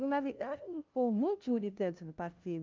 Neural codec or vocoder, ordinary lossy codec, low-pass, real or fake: codec, 16 kHz, 0.5 kbps, FunCodec, trained on LibriTTS, 25 frames a second; Opus, 24 kbps; 7.2 kHz; fake